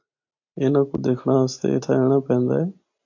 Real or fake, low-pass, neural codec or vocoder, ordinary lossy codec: real; 7.2 kHz; none; AAC, 48 kbps